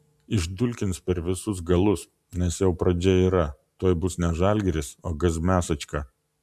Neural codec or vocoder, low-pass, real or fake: none; 14.4 kHz; real